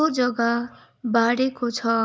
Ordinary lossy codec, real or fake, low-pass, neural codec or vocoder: none; real; none; none